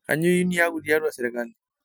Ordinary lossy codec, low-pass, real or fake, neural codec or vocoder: none; none; real; none